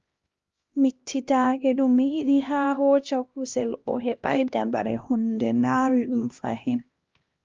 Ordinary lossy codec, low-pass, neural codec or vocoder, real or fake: Opus, 32 kbps; 7.2 kHz; codec, 16 kHz, 1 kbps, X-Codec, HuBERT features, trained on LibriSpeech; fake